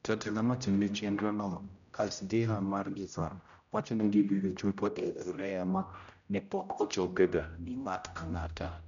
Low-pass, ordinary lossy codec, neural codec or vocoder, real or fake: 7.2 kHz; none; codec, 16 kHz, 0.5 kbps, X-Codec, HuBERT features, trained on general audio; fake